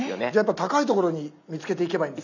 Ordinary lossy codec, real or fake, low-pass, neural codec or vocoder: none; real; 7.2 kHz; none